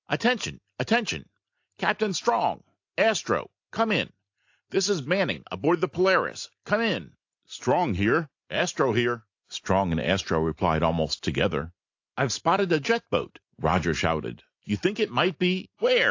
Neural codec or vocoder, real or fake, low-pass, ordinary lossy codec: none; real; 7.2 kHz; AAC, 48 kbps